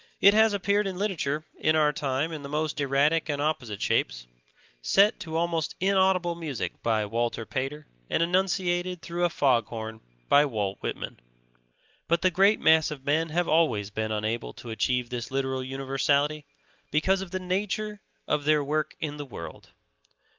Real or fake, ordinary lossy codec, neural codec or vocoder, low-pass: real; Opus, 24 kbps; none; 7.2 kHz